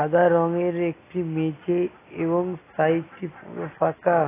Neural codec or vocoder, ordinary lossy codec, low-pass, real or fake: none; AAC, 16 kbps; 3.6 kHz; real